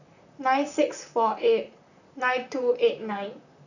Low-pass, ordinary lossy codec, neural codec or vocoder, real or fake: 7.2 kHz; none; vocoder, 44.1 kHz, 128 mel bands, Pupu-Vocoder; fake